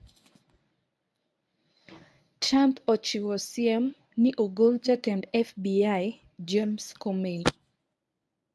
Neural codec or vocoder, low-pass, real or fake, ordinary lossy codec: codec, 24 kHz, 0.9 kbps, WavTokenizer, medium speech release version 1; none; fake; none